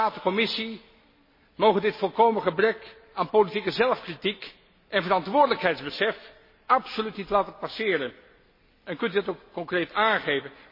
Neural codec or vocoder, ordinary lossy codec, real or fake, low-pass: none; MP3, 24 kbps; real; 5.4 kHz